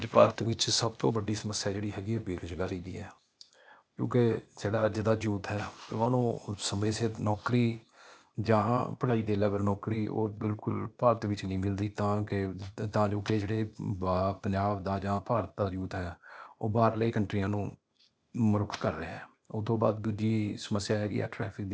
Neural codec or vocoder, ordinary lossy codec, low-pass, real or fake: codec, 16 kHz, 0.8 kbps, ZipCodec; none; none; fake